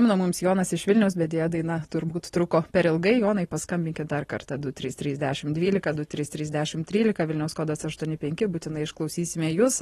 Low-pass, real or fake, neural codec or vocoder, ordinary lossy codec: 19.8 kHz; real; none; AAC, 32 kbps